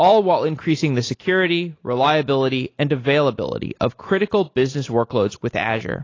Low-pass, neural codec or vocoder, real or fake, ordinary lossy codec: 7.2 kHz; none; real; AAC, 32 kbps